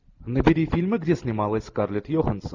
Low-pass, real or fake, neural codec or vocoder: 7.2 kHz; real; none